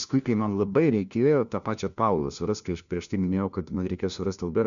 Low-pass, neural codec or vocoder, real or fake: 7.2 kHz; codec, 16 kHz, 1 kbps, FunCodec, trained on LibriTTS, 50 frames a second; fake